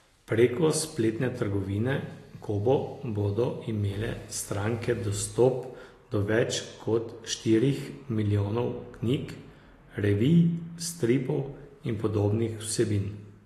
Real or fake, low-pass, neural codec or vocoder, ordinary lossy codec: real; 14.4 kHz; none; AAC, 48 kbps